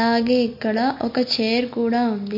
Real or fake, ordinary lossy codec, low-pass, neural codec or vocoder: real; MP3, 32 kbps; 5.4 kHz; none